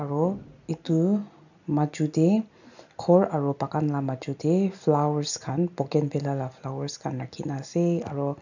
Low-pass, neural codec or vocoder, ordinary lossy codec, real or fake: 7.2 kHz; none; none; real